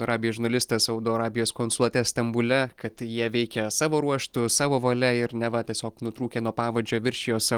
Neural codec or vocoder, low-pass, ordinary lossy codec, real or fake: none; 19.8 kHz; Opus, 24 kbps; real